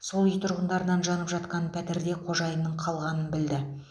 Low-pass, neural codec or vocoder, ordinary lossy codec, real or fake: none; none; none; real